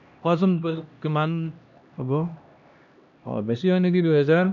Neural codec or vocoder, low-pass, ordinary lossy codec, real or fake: codec, 16 kHz, 1 kbps, X-Codec, HuBERT features, trained on LibriSpeech; 7.2 kHz; none; fake